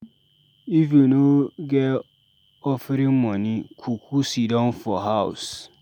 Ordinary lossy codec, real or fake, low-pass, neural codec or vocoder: none; real; 19.8 kHz; none